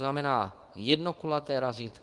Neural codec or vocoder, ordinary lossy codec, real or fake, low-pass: codec, 24 kHz, 0.9 kbps, WavTokenizer, small release; Opus, 32 kbps; fake; 10.8 kHz